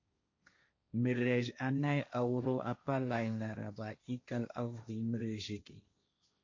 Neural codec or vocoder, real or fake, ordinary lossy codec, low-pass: codec, 16 kHz, 1.1 kbps, Voila-Tokenizer; fake; MP3, 48 kbps; 7.2 kHz